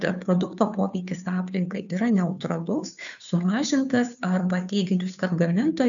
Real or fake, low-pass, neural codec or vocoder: fake; 7.2 kHz; codec, 16 kHz, 2 kbps, FunCodec, trained on Chinese and English, 25 frames a second